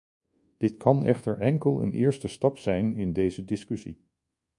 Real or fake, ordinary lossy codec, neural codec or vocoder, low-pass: fake; MP3, 48 kbps; codec, 24 kHz, 1.2 kbps, DualCodec; 10.8 kHz